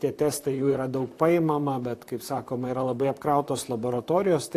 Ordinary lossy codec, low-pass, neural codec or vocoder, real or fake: AAC, 64 kbps; 14.4 kHz; vocoder, 44.1 kHz, 128 mel bands, Pupu-Vocoder; fake